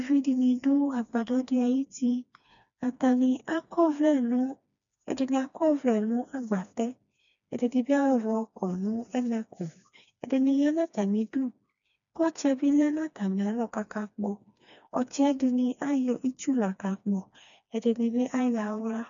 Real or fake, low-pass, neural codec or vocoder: fake; 7.2 kHz; codec, 16 kHz, 2 kbps, FreqCodec, smaller model